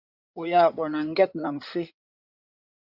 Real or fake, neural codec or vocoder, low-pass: fake; codec, 16 kHz in and 24 kHz out, 2.2 kbps, FireRedTTS-2 codec; 5.4 kHz